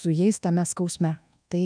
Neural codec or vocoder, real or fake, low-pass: codec, 24 kHz, 1.2 kbps, DualCodec; fake; 9.9 kHz